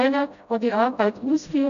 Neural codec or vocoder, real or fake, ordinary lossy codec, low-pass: codec, 16 kHz, 0.5 kbps, FreqCodec, smaller model; fake; none; 7.2 kHz